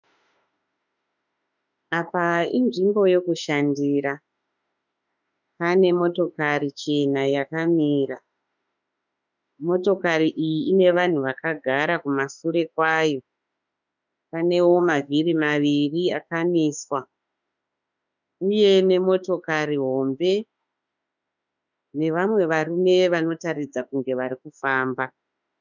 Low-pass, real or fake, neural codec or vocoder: 7.2 kHz; fake; autoencoder, 48 kHz, 32 numbers a frame, DAC-VAE, trained on Japanese speech